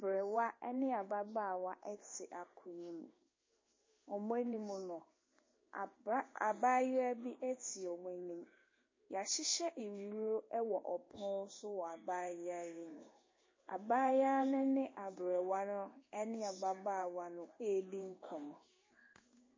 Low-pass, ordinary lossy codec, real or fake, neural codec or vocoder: 7.2 kHz; MP3, 32 kbps; fake; codec, 16 kHz in and 24 kHz out, 1 kbps, XY-Tokenizer